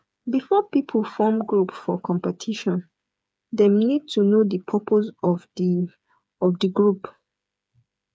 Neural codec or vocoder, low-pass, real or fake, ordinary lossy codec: codec, 16 kHz, 8 kbps, FreqCodec, smaller model; none; fake; none